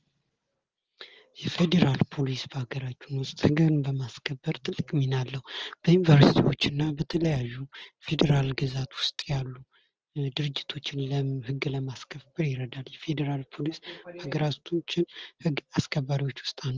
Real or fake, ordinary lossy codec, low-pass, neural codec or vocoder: real; Opus, 16 kbps; 7.2 kHz; none